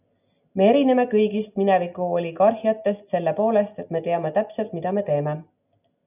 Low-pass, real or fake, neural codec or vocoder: 3.6 kHz; real; none